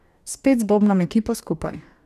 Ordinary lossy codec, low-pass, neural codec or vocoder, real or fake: none; 14.4 kHz; codec, 44.1 kHz, 2.6 kbps, DAC; fake